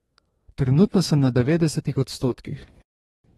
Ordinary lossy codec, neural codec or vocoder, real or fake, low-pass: AAC, 32 kbps; codec, 32 kHz, 1.9 kbps, SNAC; fake; 14.4 kHz